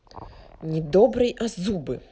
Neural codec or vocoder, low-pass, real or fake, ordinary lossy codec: none; none; real; none